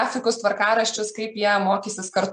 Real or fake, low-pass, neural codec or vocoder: real; 9.9 kHz; none